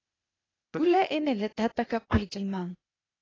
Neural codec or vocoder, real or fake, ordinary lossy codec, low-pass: codec, 16 kHz, 0.8 kbps, ZipCodec; fake; AAC, 32 kbps; 7.2 kHz